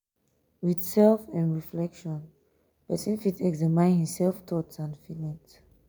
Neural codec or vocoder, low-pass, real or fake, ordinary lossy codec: none; none; real; none